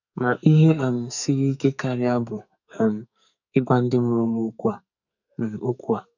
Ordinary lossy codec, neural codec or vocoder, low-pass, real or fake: none; codec, 44.1 kHz, 2.6 kbps, SNAC; 7.2 kHz; fake